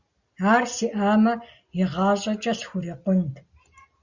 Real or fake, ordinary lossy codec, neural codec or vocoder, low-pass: real; Opus, 64 kbps; none; 7.2 kHz